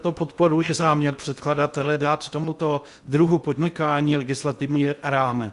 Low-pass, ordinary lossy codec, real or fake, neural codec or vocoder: 10.8 kHz; Opus, 64 kbps; fake; codec, 16 kHz in and 24 kHz out, 0.6 kbps, FocalCodec, streaming, 2048 codes